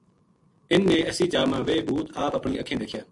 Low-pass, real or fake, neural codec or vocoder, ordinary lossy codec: 10.8 kHz; real; none; AAC, 64 kbps